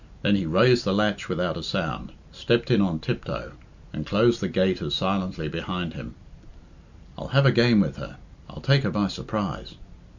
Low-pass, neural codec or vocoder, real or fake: 7.2 kHz; none; real